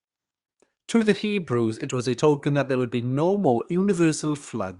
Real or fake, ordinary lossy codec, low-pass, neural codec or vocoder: fake; Opus, 64 kbps; 10.8 kHz; codec, 24 kHz, 1 kbps, SNAC